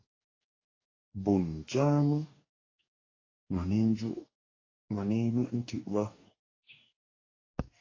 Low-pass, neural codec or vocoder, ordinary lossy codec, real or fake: 7.2 kHz; codec, 44.1 kHz, 2.6 kbps, DAC; AAC, 32 kbps; fake